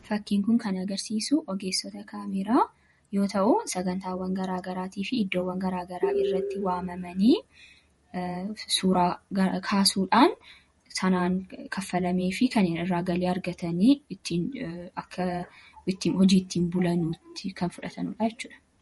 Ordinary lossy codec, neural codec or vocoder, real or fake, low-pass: MP3, 48 kbps; vocoder, 48 kHz, 128 mel bands, Vocos; fake; 19.8 kHz